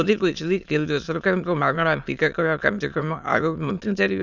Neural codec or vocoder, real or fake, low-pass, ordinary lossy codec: autoencoder, 22.05 kHz, a latent of 192 numbers a frame, VITS, trained on many speakers; fake; 7.2 kHz; none